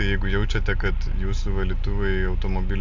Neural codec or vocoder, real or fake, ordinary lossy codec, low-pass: none; real; AAC, 48 kbps; 7.2 kHz